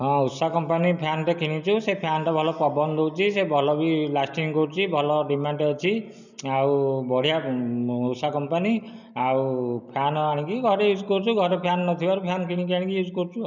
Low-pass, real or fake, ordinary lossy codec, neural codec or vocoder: 7.2 kHz; real; none; none